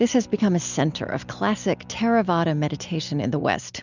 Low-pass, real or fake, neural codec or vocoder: 7.2 kHz; real; none